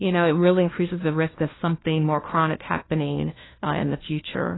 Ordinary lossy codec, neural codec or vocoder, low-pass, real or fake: AAC, 16 kbps; codec, 16 kHz, 1 kbps, FunCodec, trained on LibriTTS, 50 frames a second; 7.2 kHz; fake